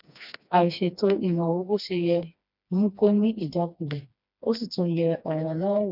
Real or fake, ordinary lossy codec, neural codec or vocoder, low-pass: fake; none; codec, 16 kHz, 2 kbps, FreqCodec, smaller model; 5.4 kHz